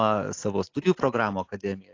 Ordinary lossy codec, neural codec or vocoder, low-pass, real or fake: AAC, 48 kbps; none; 7.2 kHz; real